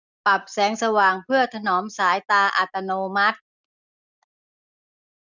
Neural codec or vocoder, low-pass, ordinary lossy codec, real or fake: none; 7.2 kHz; none; real